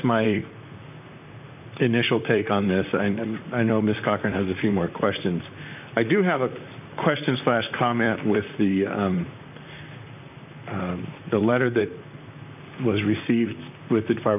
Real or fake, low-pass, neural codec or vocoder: fake; 3.6 kHz; vocoder, 44.1 kHz, 128 mel bands, Pupu-Vocoder